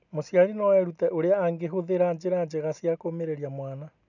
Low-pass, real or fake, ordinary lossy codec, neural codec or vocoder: 7.2 kHz; real; none; none